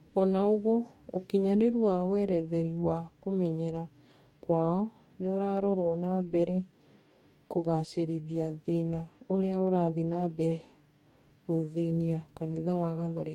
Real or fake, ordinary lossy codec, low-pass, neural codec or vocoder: fake; MP3, 64 kbps; 19.8 kHz; codec, 44.1 kHz, 2.6 kbps, DAC